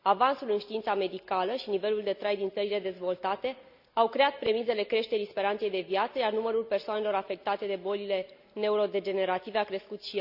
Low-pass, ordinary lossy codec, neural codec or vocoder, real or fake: 5.4 kHz; none; none; real